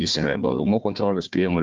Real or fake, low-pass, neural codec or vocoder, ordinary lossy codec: fake; 7.2 kHz; codec, 16 kHz, 1 kbps, FunCodec, trained on Chinese and English, 50 frames a second; Opus, 32 kbps